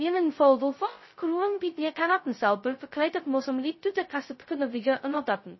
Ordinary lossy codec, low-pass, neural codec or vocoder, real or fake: MP3, 24 kbps; 7.2 kHz; codec, 16 kHz, 0.2 kbps, FocalCodec; fake